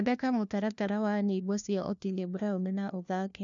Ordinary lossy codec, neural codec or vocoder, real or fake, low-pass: none; codec, 16 kHz, 1 kbps, FunCodec, trained on LibriTTS, 50 frames a second; fake; 7.2 kHz